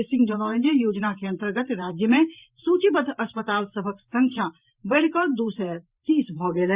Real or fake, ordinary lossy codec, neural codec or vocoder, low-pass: fake; Opus, 64 kbps; vocoder, 44.1 kHz, 128 mel bands every 512 samples, BigVGAN v2; 3.6 kHz